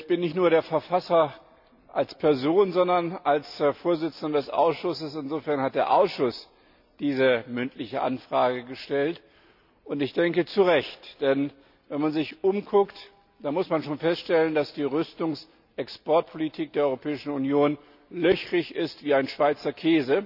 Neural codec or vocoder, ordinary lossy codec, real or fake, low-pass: none; none; real; 5.4 kHz